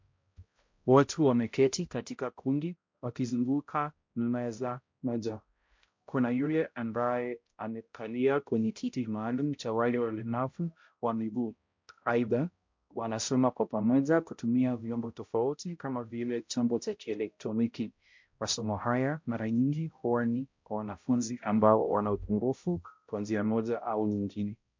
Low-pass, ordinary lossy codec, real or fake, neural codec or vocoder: 7.2 kHz; MP3, 48 kbps; fake; codec, 16 kHz, 0.5 kbps, X-Codec, HuBERT features, trained on balanced general audio